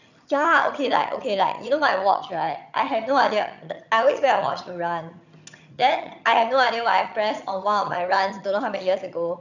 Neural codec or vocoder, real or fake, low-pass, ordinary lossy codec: vocoder, 22.05 kHz, 80 mel bands, HiFi-GAN; fake; 7.2 kHz; none